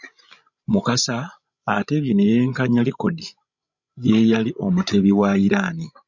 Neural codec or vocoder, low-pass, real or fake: codec, 16 kHz, 16 kbps, FreqCodec, larger model; 7.2 kHz; fake